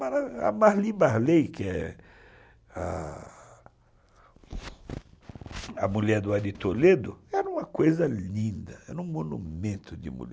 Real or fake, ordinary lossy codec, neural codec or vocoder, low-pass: real; none; none; none